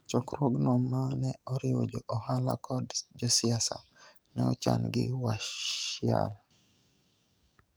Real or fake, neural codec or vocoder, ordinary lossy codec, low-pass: fake; codec, 44.1 kHz, 7.8 kbps, DAC; none; none